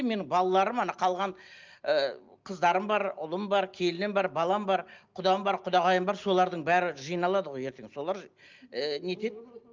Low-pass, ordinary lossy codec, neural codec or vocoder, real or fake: 7.2 kHz; Opus, 24 kbps; none; real